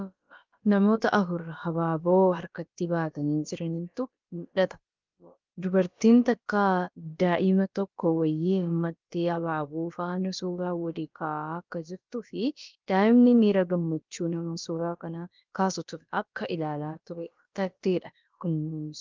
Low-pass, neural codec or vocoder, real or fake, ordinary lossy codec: 7.2 kHz; codec, 16 kHz, about 1 kbps, DyCAST, with the encoder's durations; fake; Opus, 24 kbps